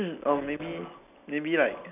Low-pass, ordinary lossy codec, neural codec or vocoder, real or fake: 3.6 kHz; none; none; real